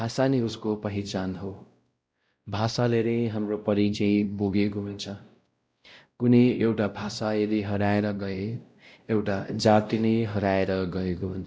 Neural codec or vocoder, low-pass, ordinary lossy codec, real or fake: codec, 16 kHz, 0.5 kbps, X-Codec, WavLM features, trained on Multilingual LibriSpeech; none; none; fake